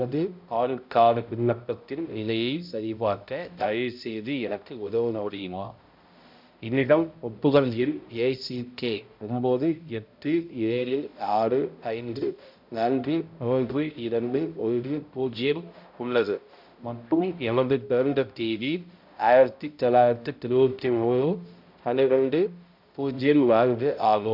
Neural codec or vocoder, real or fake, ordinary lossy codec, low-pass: codec, 16 kHz, 0.5 kbps, X-Codec, HuBERT features, trained on balanced general audio; fake; MP3, 48 kbps; 5.4 kHz